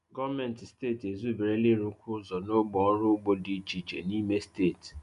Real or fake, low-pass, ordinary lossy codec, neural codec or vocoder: real; 10.8 kHz; none; none